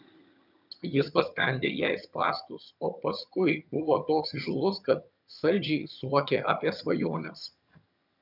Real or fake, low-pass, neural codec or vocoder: fake; 5.4 kHz; vocoder, 22.05 kHz, 80 mel bands, HiFi-GAN